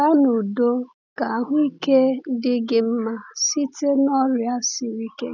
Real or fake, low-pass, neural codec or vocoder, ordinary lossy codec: real; 7.2 kHz; none; none